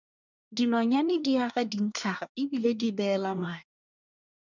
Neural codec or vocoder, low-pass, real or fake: codec, 24 kHz, 1 kbps, SNAC; 7.2 kHz; fake